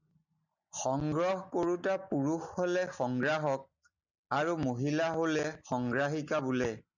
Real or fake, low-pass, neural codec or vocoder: real; 7.2 kHz; none